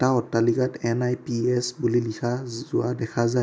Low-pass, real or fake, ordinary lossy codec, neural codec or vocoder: none; real; none; none